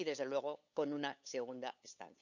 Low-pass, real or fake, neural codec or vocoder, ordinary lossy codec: 7.2 kHz; fake; codec, 16 kHz, 8 kbps, FunCodec, trained on LibriTTS, 25 frames a second; none